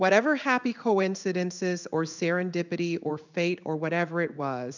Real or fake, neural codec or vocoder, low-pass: fake; codec, 16 kHz in and 24 kHz out, 1 kbps, XY-Tokenizer; 7.2 kHz